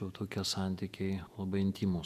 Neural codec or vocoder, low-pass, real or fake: vocoder, 48 kHz, 128 mel bands, Vocos; 14.4 kHz; fake